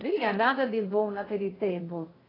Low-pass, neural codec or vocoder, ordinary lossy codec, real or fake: 5.4 kHz; codec, 16 kHz in and 24 kHz out, 0.6 kbps, FocalCodec, streaming, 2048 codes; AAC, 24 kbps; fake